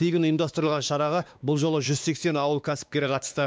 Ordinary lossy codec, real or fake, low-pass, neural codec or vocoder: none; fake; none; codec, 16 kHz, 2 kbps, X-Codec, WavLM features, trained on Multilingual LibriSpeech